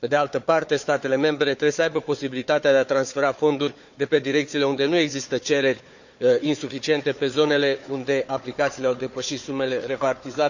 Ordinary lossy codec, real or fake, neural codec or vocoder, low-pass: none; fake; codec, 16 kHz, 4 kbps, FunCodec, trained on Chinese and English, 50 frames a second; 7.2 kHz